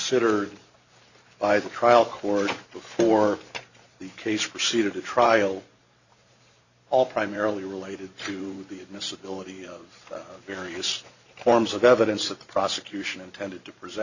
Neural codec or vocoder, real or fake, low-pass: vocoder, 44.1 kHz, 128 mel bands every 512 samples, BigVGAN v2; fake; 7.2 kHz